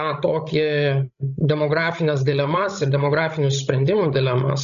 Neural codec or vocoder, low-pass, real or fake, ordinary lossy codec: codec, 16 kHz, 16 kbps, FreqCodec, larger model; 7.2 kHz; fake; AAC, 96 kbps